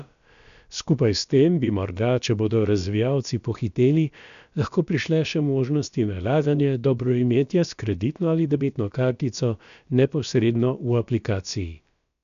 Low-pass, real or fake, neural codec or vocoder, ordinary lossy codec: 7.2 kHz; fake; codec, 16 kHz, about 1 kbps, DyCAST, with the encoder's durations; none